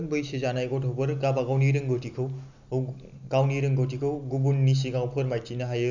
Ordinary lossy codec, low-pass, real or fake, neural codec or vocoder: none; 7.2 kHz; real; none